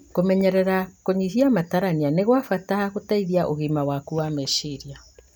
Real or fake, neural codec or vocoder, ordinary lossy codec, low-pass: real; none; none; none